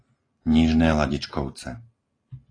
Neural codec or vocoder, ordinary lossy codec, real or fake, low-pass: none; AAC, 32 kbps; real; 9.9 kHz